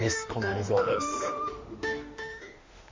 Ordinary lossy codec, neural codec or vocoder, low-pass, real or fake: MP3, 48 kbps; autoencoder, 48 kHz, 32 numbers a frame, DAC-VAE, trained on Japanese speech; 7.2 kHz; fake